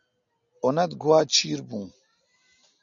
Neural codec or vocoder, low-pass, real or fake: none; 7.2 kHz; real